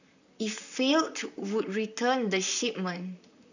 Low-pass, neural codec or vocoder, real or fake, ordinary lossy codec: 7.2 kHz; vocoder, 44.1 kHz, 128 mel bands, Pupu-Vocoder; fake; none